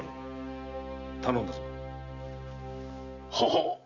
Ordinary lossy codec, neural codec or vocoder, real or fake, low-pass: none; none; real; 7.2 kHz